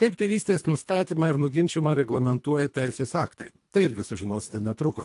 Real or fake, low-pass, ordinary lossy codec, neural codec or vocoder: fake; 10.8 kHz; AAC, 64 kbps; codec, 24 kHz, 1.5 kbps, HILCodec